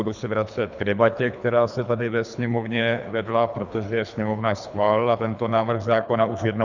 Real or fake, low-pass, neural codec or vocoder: fake; 7.2 kHz; codec, 24 kHz, 3 kbps, HILCodec